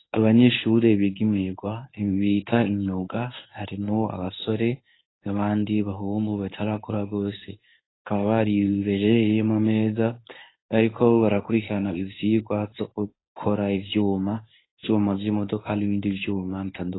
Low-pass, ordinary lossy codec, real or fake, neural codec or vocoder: 7.2 kHz; AAC, 16 kbps; fake; codec, 24 kHz, 0.9 kbps, WavTokenizer, medium speech release version 1